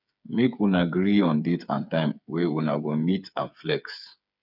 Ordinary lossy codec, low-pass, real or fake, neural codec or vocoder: none; 5.4 kHz; fake; codec, 16 kHz, 8 kbps, FreqCodec, smaller model